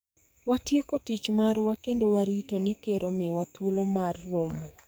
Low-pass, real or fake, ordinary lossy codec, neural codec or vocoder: none; fake; none; codec, 44.1 kHz, 2.6 kbps, SNAC